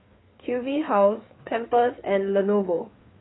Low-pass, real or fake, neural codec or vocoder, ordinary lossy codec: 7.2 kHz; fake; codec, 16 kHz, 4 kbps, FreqCodec, larger model; AAC, 16 kbps